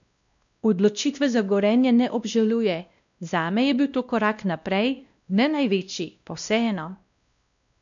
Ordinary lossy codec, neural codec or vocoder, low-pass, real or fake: none; codec, 16 kHz, 1 kbps, X-Codec, WavLM features, trained on Multilingual LibriSpeech; 7.2 kHz; fake